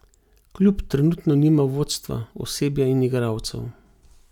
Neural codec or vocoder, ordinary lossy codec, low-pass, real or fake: none; none; 19.8 kHz; real